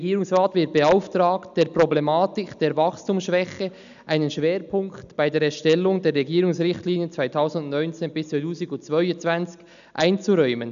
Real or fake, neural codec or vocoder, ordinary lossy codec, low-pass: real; none; none; 7.2 kHz